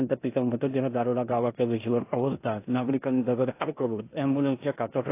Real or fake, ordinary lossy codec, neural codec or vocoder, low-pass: fake; AAC, 24 kbps; codec, 16 kHz in and 24 kHz out, 0.9 kbps, LongCat-Audio-Codec, four codebook decoder; 3.6 kHz